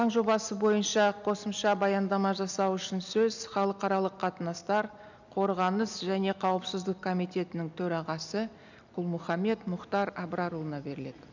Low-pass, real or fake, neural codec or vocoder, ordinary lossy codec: 7.2 kHz; real; none; none